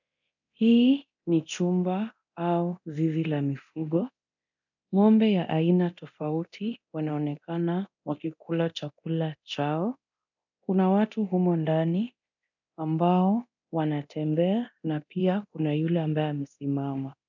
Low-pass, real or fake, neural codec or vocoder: 7.2 kHz; fake; codec, 24 kHz, 0.9 kbps, DualCodec